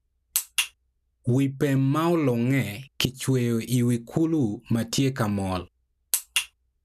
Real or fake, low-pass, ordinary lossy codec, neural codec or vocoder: real; 14.4 kHz; none; none